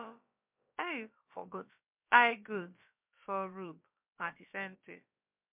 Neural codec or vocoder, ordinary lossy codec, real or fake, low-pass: codec, 16 kHz, about 1 kbps, DyCAST, with the encoder's durations; MP3, 32 kbps; fake; 3.6 kHz